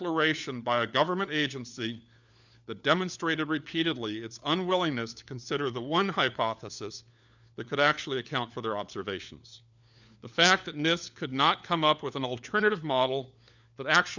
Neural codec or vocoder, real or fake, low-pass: codec, 16 kHz, 4 kbps, FunCodec, trained on LibriTTS, 50 frames a second; fake; 7.2 kHz